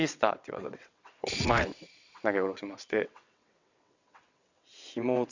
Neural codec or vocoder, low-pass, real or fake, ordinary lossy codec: vocoder, 22.05 kHz, 80 mel bands, WaveNeXt; 7.2 kHz; fake; Opus, 64 kbps